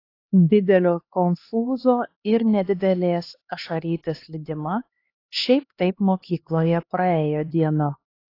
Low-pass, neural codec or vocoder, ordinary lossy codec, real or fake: 5.4 kHz; codec, 16 kHz, 2 kbps, X-Codec, HuBERT features, trained on LibriSpeech; AAC, 32 kbps; fake